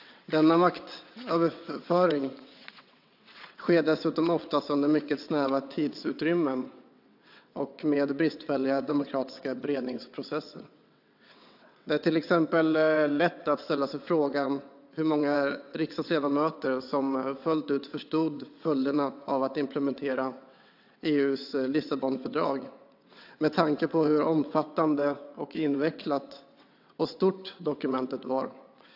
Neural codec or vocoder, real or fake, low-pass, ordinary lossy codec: vocoder, 22.05 kHz, 80 mel bands, WaveNeXt; fake; 5.4 kHz; AAC, 48 kbps